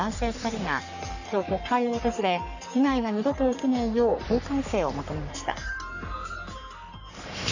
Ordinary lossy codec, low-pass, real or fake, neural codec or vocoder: none; 7.2 kHz; fake; codec, 44.1 kHz, 3.4 kbps, Pupu-Codec